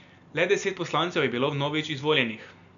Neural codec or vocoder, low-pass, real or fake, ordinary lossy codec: none; 7.2 kHz; real; none